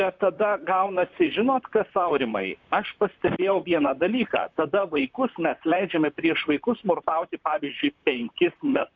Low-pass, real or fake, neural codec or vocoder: 7.2 kHz; fake; vocoder, 44.1 kHz, 128 mel bands, Pupu-Vocoder